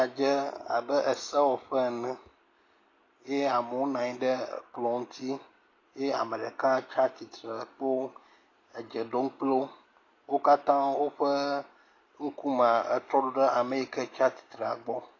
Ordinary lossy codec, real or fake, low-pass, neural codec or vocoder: AAC, 32 kbps; real; 7.2 kHz; none